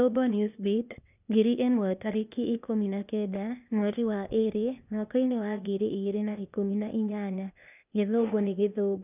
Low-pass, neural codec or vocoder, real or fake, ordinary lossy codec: 3.6 kHz; codec, 16 kHz, 0.8 kbps, ZipCodec; fake; none